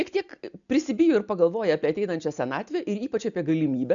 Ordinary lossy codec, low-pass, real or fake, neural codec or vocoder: MP3, 96 kbps; 7.2 kHz; real; none